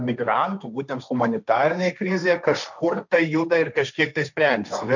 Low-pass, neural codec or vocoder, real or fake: 7.2 kHz; codec, 16 kHz, 1.1 kbps, Voila-Tokenizer; fake